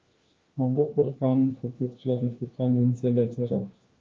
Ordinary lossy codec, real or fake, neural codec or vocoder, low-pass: Opus, 24 kbps; fake; codec, 16 kHz, 1 kbps, FunCodec, trained on LibriTTS, 50 frames a second; 7.2 kHz